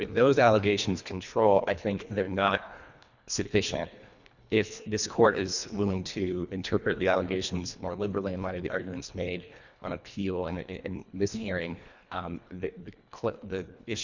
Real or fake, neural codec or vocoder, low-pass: fake; codec, 24 kHz, 1.5 kbps, HILCodec; 7.2 kHz